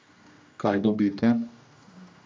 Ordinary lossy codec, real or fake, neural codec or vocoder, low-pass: none; fake; codec, 16 kHz, 1 kbps, X-Codec, HuBERT features, trained on general audio; none